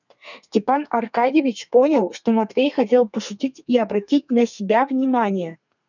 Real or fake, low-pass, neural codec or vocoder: fake; 7.2 kHz; codec, 32 kHz, 1.9 kbps, SNAC